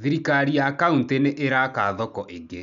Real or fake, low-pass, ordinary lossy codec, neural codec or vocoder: real; 7.2 kHz; none; none